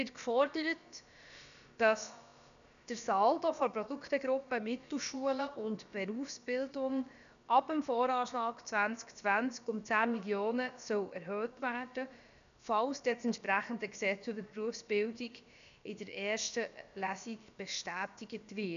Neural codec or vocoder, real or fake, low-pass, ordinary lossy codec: codec, 16 kHz, about 1 kbps, DyCAST, with the encoder's durations; fake; 7.2 kHz; none